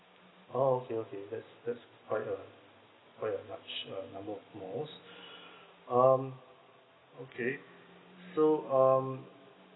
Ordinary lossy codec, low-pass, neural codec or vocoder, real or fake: AAC, 16 kbps; 7.2 kHz; none; real